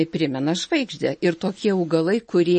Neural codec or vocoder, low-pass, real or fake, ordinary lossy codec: none; 10.8 kHz; real; MP3, 32 kbps